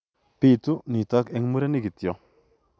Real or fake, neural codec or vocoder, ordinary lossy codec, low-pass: real; none; none; none